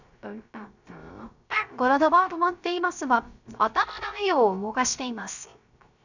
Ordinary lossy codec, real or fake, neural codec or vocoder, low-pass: none; fake; codec, 16 kHz, 0.3 kbps, FocalCodec; 7.2 kHz